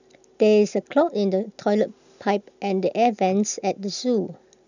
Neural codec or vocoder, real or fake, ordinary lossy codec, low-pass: none; real; none; 7.2 kHz